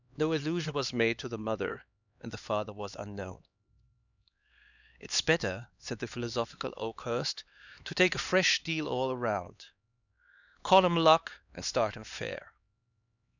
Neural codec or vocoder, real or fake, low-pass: codec, 16 kHz, 2 kbps, X-Codec, HuBERT features, trained on LibriSpeech; fake; 7.2 kHz